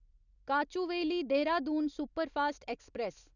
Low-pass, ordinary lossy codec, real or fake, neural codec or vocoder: 7.2 kHz; none; real; none